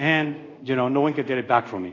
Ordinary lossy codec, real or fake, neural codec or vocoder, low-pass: AAC, 32 kbps; fake; codec, 24 kHz, 0.5 kbps, DualCodec; 7.2 kHz